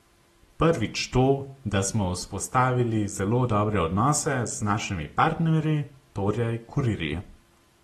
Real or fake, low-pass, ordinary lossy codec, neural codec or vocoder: real; 19.8 kHz; AAC, 32 kbps; none